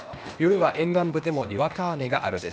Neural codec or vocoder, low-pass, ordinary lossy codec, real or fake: codec, 16 kHz, 0.8 kbps, ZipCodec; none; none; fake